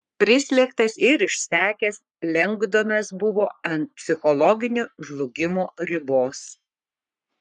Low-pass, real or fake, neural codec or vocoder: 10.8 kHz; fake; codec, 44.1 kHz, 3.4 kbps, Pupu-Codec